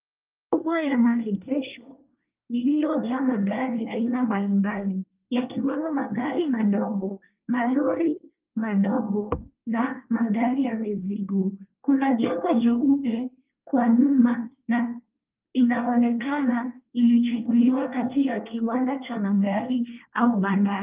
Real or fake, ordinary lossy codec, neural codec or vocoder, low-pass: fake; Opus, 24 kbps; codec, 24 kHz, 1 kbps, SNAC; 3.6 kHz